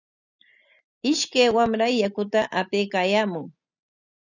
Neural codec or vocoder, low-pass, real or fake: none; 7.2 kHz; real